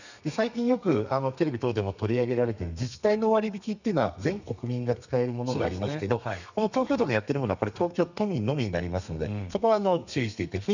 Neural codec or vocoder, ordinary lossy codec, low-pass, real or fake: codec, 32 kHz, 1.9 kbps, SNAC; none; 7.2 kHz; fake